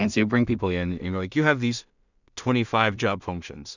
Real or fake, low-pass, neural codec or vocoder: fake; 7.2 kHz; codec, 16 kHz in and 24 kHz out, 0.4 kbps, LongCat-Audio-Codec, two codebook decoder